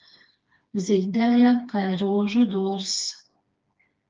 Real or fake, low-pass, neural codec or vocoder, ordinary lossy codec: fake; 7.2 kHz; codec, 16 kHz, 2 kbps, FreqCodec, smaller model; Opus, 24 kbps